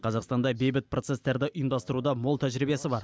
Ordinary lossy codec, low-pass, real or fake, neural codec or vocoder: none; none; real; none